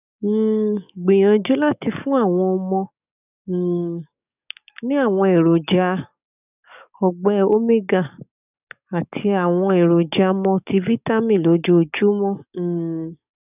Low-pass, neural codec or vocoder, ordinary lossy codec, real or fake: 3.6 kHz; codec, 44.1 kHz, 7.8 kbps, DAC; none; fake